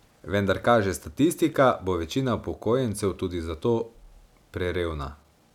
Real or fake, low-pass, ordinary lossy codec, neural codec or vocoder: real; 19.8 kHz; none; none